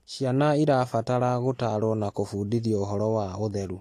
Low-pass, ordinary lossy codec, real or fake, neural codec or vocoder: 14.4 kHz; AAC, 48 kbps; real; none